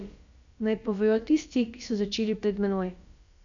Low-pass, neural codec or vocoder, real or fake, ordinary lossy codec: 7.2 kHz; codec, 16 kHz, about 1 kbps, DyCAST, with the encoder's durations; fake; none